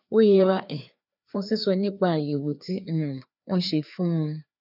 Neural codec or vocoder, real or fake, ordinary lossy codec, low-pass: codec, 16 kHz, 2 kbps, FreqCodec, larger model; fake; none; 5.4 kHz